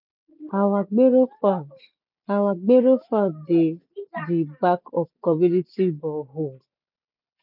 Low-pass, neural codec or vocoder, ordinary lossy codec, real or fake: 5.4 kHz; none; none; real